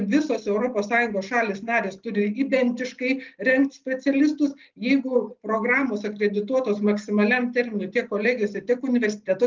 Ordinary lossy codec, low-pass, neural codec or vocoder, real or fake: Opus, 32 kbps; 7.2 kHz; none; real